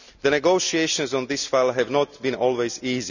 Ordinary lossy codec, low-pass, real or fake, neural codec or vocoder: none; 7.2 kHz; real; none